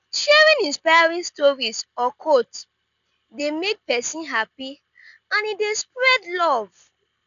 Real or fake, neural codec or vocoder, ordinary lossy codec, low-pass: real; none; none; 7.2 kHz